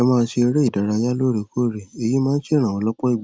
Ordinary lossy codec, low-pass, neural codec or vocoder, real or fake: none; none; none; real